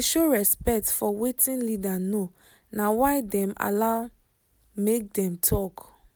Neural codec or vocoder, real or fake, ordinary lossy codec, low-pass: none; real; none; none